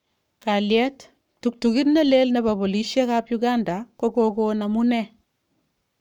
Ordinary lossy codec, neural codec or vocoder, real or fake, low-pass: none; codec, 44.1 kHz, 7.8 kbps, Pupu-Codec; fake; 19.8 kHz